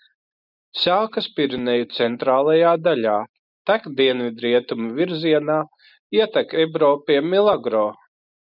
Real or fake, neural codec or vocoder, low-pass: real; none; 5.4 kHz